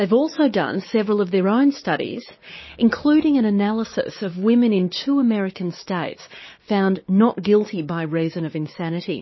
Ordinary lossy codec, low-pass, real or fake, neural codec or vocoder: MP3, 24 kbps; 7.2 kHz; fake; codec, 16 kHz, 6 kbps, DAC